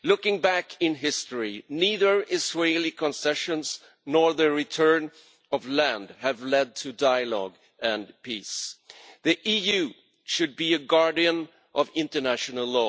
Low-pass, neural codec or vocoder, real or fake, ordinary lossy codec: none; none; real; none